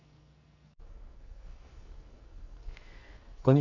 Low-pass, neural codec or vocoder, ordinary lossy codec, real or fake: 7.2 kHz; codec, 44.1 kHz, 2.6 kbps, SNAC; none; fake